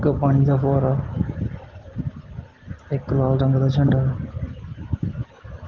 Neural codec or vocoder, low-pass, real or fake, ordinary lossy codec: none; 7.2 kHz; real; Opus, 16 kbps